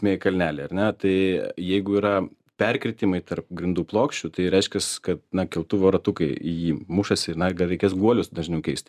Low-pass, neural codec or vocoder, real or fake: 14.4 kHz; none; real